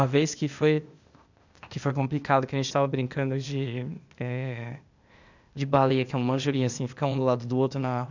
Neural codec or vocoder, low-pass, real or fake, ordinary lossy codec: codec, 16 kHz, 0.8 kbps, ZipCodec; 7.2 kHz; fake; none